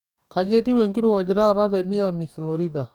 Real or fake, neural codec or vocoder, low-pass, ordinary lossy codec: fake; codec, 44.1 kHz, 2.6 kbps, DAC; 19.8 kHz; none